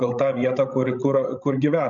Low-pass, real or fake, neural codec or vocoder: 7.2 kHz; fake; codec, 16 kHz, 16 kbps, FreqCodec, larger model